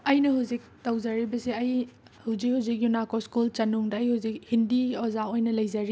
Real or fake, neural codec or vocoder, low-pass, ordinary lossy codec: real; none; none; none